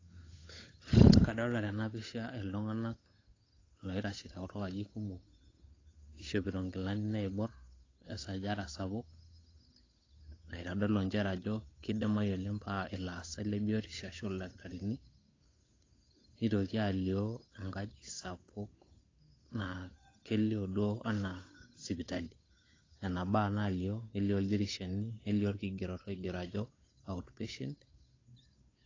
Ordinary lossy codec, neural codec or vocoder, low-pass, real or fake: AAC, 32 kbps; codec, 16 kHz, 8 kbps, FunCodec, trained on Chinese and English, 25 frames a second; 7.2 kHz; fake